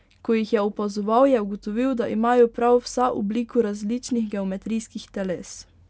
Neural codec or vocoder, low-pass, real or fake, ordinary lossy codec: none; none; real; none